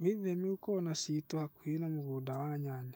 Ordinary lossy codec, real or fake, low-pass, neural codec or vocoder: none; fake; 19.8 kHz; autoencoder, 48 kHz, 128 numbers a frame, DAC-VAE, trained on Japanese speech